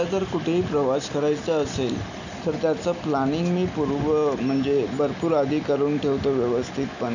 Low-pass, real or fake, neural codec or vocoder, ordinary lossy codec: 7.2 kHz; real; none; none